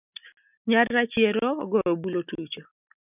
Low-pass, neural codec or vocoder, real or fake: 3.6 kHz; none; real